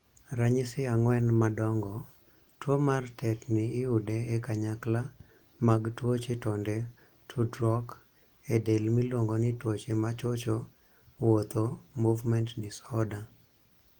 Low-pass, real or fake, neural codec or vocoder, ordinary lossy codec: 19.8 kHz; real; none; Opus, 24 kbps